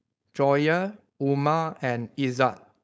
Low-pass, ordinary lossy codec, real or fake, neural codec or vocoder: none; none; fake; codec, 16 kHz, 4.8 kbps, FACodec